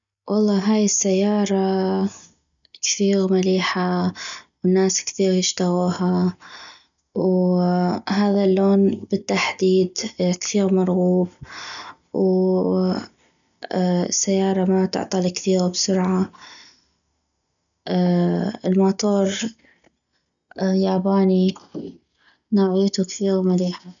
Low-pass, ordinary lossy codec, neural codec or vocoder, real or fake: 7.2 kHz; none; none; real